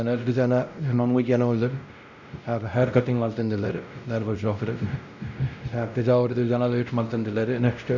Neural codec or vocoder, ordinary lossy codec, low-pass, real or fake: codec, 16 kHz, 0.5 kbps, X-Codec, WavLM features, trained on Multilingual LibriSpeech; none; 7.2 kHz; fake